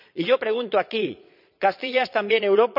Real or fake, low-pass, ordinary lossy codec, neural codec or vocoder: fake; 5.4 kHz; none; vocoder, 22.05 kHz, 80 mel bands, Vocos